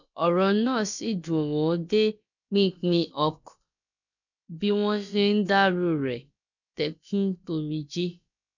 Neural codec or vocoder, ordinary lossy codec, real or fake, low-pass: codec, 16 kHz, about 1 kbps, DyCAST, with the encoder's durations; none; fake; 7.2 kHz